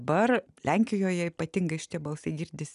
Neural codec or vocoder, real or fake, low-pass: none; real; 10.8 kHz